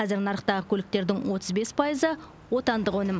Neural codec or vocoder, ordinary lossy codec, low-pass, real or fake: none; none; none; real